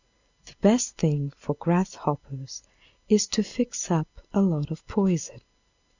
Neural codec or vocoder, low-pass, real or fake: none; 7.2 kHz; real